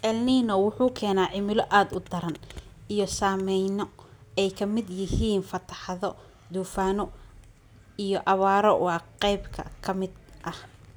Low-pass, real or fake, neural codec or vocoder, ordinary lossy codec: none; real; none; none